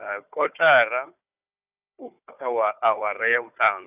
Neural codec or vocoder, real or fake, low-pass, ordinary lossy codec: codec, 16 kHz, 4 kbps, FunCodec, trained on Chinese and English, 50 frames a second; fake; 3.6 kHz; none